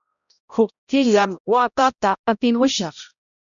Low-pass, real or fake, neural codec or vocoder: 7.2 kHz; fake; codec, 16 kHz, 0.5 kbps, X-Codec, HuBERT features, trained on balanced general audio